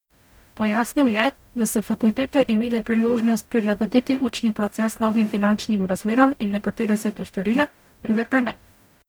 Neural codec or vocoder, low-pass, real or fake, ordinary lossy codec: codec, 44.1 kHz, 0.9 kbps, DAC; none; fake; none